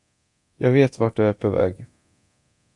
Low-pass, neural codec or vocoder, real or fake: 10.8 kHz; codec, 24 kHz, 0.9 kbps, DualCodec; fake